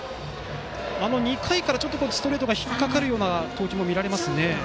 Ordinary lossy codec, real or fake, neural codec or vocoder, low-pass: none; real; none; none